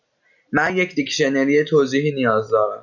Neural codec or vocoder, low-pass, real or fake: none; 7.2 kHz; real